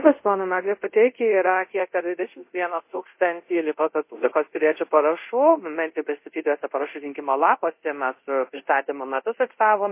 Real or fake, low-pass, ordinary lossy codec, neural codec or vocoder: fake; 3.6 kHz; MP3, 24 kbps; codec, 24 kHz, 0.5 kbps, DualCodec